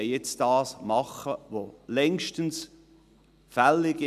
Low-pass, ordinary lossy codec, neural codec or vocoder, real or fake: 14.4 kHz; none; none; real